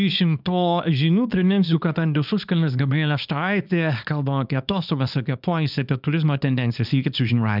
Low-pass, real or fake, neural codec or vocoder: 5.4 kHz; fake; codec, 24 kHz, 0.9 kbps, WavTokenizer, small release